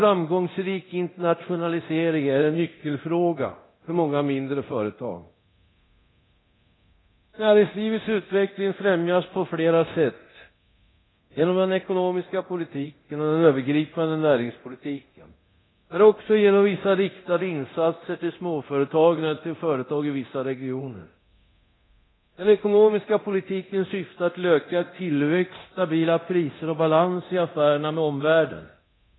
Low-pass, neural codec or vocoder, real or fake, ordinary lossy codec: 7.2 kHz; codec, 24 kHz, 0.9 kbps, DualCodec; fake; AAC, 16 kbps